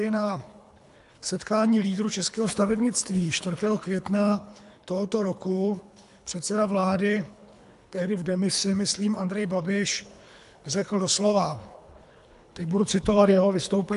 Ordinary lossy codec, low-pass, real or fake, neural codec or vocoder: AAC, 96 kbps; 10.8 kHz; fake; codec, 24 kHz, 3 kbps, HILCodec